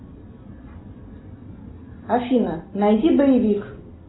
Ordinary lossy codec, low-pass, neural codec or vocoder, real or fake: AAC, 16 kbps; 7.2 kHz; none; real